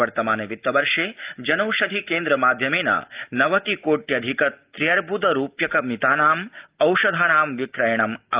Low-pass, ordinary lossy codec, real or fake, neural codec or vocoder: 3.6 kHz; Opus, 32 kbps; real; none